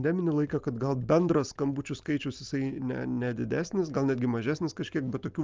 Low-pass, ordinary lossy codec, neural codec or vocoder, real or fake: 7.2 kHz; Opus, 24 kbps; none; real